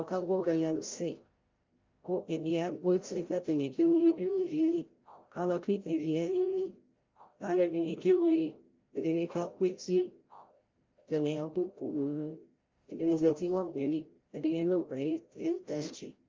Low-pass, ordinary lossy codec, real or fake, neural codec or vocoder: 7.2 kHz; Opus, 32 kbps; fake; codec, 16 kHz, 0.5 kbps, FreqCodec, larger model